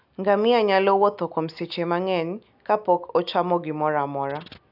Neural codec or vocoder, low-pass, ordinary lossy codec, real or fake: none; 5.4 kHz; none; real